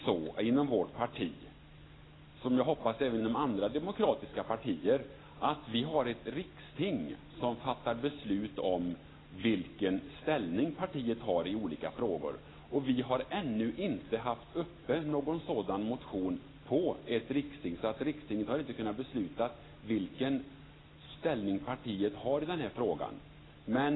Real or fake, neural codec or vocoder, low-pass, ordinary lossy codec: real; none; 7.2 kHz; AAC, 16 kbps